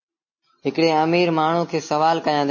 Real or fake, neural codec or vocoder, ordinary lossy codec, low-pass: real; none; MP3, 32 kbps; 7.2 kHz